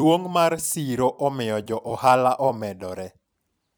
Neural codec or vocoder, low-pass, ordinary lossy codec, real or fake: vocoder, 44.1 kHz, 128 mel bands every 256 samples, BigVGAN v2; none; none; fake